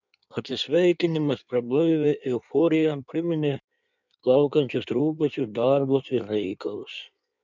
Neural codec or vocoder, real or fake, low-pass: codec, 16 kHz in and 24 kHz out, 1.1 kbps, FireRedTTS-2 codec; fake; 7.2 kHz